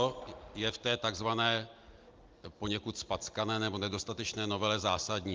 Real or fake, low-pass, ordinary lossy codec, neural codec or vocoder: real; 7.2 kHz; Opus, 24 kbps; none